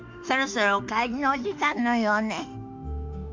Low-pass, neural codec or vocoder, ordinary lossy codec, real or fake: 7.2 kHz; autoencoder, 48 kHz, 32 numbers a frame, DAC-VAE, trained on Japanese speech; MP3, 48 kbps; fake